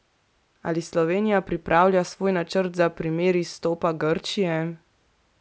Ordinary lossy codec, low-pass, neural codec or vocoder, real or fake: none; none; none; real